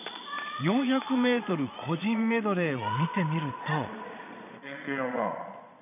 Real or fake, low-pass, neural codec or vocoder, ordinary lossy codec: fake; 3.6 kHz; vocoder, 22.05 kHz, 80 mel bands, Vocos; MP3, 32 kbps